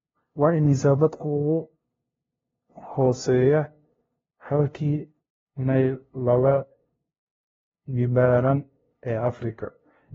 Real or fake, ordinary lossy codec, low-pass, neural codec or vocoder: fake; AAC, 24 kbps; 7.2 kHz; codec, 16 kHz, 0.5 kbps, FunCodec, trained on LibriTTS, 25 frames a second